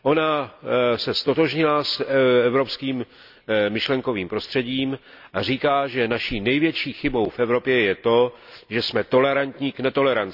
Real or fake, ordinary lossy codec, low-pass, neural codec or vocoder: real; none; 5.4 kHz; none